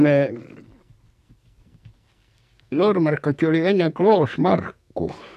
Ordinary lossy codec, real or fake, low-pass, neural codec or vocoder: none; fake; 14.4 kHz; codec, 44.1 kHz, 2.6 kbps, SNAC